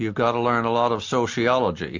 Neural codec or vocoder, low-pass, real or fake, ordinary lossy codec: none; 7.2 kHz; real; MP3, 48 kbps